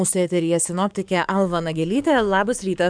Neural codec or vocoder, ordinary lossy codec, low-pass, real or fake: codec, 44.1 kHz, 7.8 kbps, DAC; MP3, 96 kbps; 9.9 kHz; fake